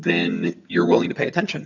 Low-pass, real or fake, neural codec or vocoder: 7.2 kHz; fake; vocoder, 22.05 kHz, 80 mel bands, HiFi-GAN